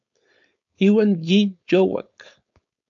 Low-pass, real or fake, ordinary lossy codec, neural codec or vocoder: 7.2 kHz; fake; AAC, 64 kbps; codec, 16 kHz, 4.8 kbps, FACodec